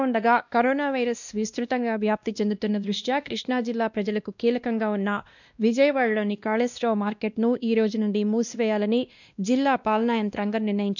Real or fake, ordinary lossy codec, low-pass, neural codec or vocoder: fake; none; 7.2 kHz; codec, 16 kHz, 1 kbps, X-Codec, WavLM features, trained on Multilingual LibriSpeech